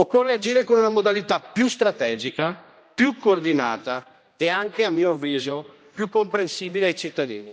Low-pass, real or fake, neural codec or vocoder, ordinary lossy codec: none; fake; codec, 16 kHz, 1 kbps, X-Codec, HuBERT features, trained on general audio; none